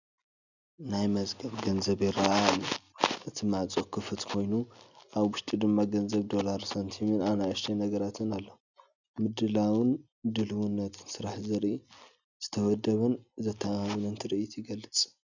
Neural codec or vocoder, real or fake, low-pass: none; real; 7.2 kHz